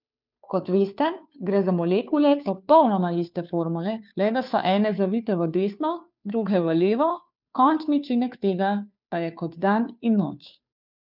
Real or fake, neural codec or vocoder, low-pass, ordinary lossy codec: fake; codec, 16 kHz, 2 kbps, FunCodec, trained on Chinese and English, 25 frames a second; 5.4 kHz; none